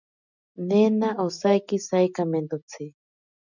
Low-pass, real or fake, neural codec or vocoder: 7.2 kHz; real; none